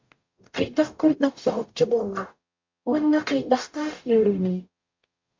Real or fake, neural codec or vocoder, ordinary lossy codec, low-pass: fake; codec, 44.1 kHz, 0.9 kbps, DAC; MP3, 48 kbps; 7.2 kHz